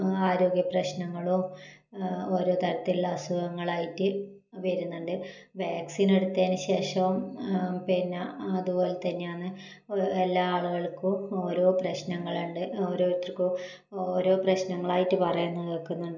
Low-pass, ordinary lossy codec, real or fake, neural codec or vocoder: 7.2 kHz; none; real; none